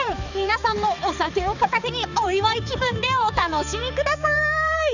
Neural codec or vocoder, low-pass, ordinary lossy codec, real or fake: codec, 16 kHz, 4 kbps, X-Codec, HuBERT features, trained on balanced general audio; 7.2 kHz; none; fake